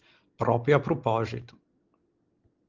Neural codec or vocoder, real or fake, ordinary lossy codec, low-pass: none; real; Opus, 16 kbps; 7.2 kHz